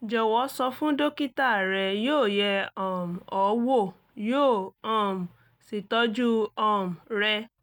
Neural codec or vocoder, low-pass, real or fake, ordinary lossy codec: none; 19.8 kHz; real; none